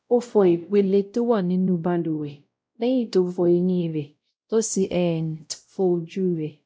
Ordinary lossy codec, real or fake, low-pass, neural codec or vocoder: none; fake; none; codec, 16 kHz, 0.5 kbps, X-Codec, WavLM features, trained on Multilingual LibriSpeech